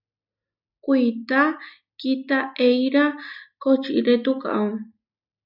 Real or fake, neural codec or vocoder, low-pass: real; none; 5.4 kHz